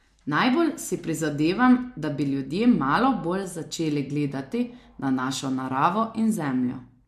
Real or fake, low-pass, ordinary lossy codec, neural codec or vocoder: real; 14.4 kHz; MP3, 64 kbps; none